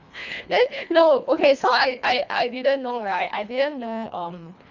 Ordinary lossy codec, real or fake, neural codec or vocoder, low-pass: none; fake; codec, 24 kHz, 1.5 kbps, HILCodec; 7.2 kHz